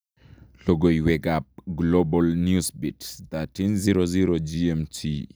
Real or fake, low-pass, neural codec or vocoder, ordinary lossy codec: real; none; none; none